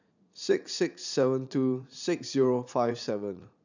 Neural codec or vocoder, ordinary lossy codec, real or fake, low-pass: vocoder, 44.1 kHz, 80 mel bands, Vocos; none; fake; 7.2 kHz